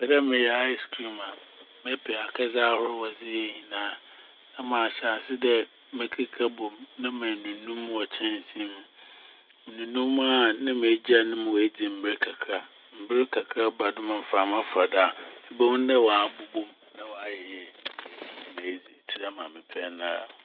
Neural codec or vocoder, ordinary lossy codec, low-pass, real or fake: none; none; 5.4 kHz; real